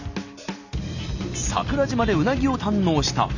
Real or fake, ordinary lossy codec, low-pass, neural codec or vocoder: real; none; 7.2 kHz; none